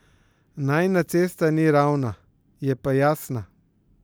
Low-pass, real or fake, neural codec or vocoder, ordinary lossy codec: none; real; none; none